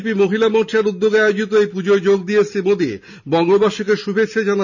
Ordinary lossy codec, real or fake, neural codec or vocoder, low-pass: none; real; none; 7.2 kHz